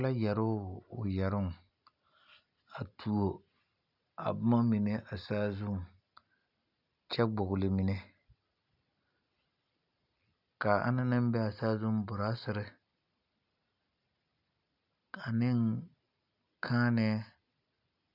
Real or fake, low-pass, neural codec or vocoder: real; 5.4 kHz; none